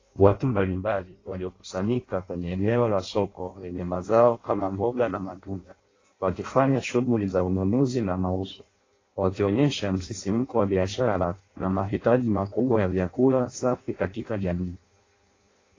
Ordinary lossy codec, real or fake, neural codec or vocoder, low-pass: AAC, 32 kbps; fake; codec, 16 kHz in and 24 kHz out, 0.6 kbps, FireRedTTS-2 codec; 7.2 kHz